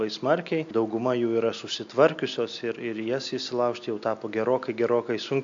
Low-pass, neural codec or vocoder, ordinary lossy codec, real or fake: 7.2 kHz; none; AAC, 64 kbps; real